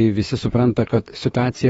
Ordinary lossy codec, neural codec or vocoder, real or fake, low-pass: AAC, 24 kbps; autoencoder, 48 kHz, 32 numbers a frame, DAC-VAE, trained on Japanese speech; fake; 19.8 kHz